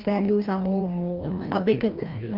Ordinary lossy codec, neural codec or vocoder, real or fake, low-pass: Opus, 24 kbps; codec, 16 kHz, 1 kbps, FreqCodec, larger model; fake; 5.4 kHz